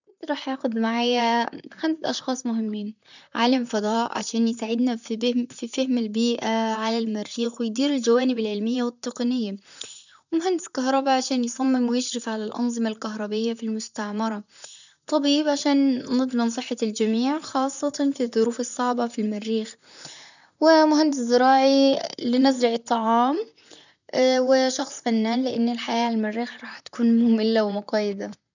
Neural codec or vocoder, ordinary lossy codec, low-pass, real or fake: vocoder, 44.1 kHz, 128 mel bands, Pupu-Vocoder; none; 7.2 kHz; fake